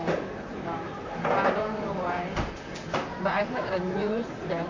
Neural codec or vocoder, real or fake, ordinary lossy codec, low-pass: vocoder, 44.1 kHz, 128 mel bands, Pupu-Vocoder; fake; MP3, 48 kbps; 7.2 kHz